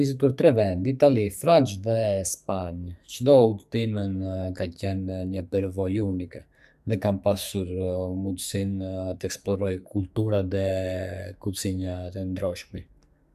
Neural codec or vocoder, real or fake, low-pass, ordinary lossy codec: codec, 44.1 kHz, 2.6 kbps, SNAC; fake; 14.4 kHz; none